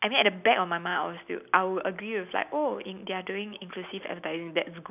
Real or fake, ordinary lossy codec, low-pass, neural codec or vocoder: real; none; 3.6 kHz; none